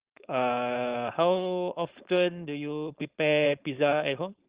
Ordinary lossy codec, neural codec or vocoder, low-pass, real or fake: Opus, 32 kbps; codec, 16 kHz, 4.8 kbps, FACodec; 3.6 kHz; fake